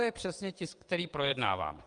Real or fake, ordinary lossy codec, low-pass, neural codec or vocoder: fake; Opus, 32 kbps; 9.9 kHz; vocoder, 22.05 kHz, 80 mel bands, WaveNeXt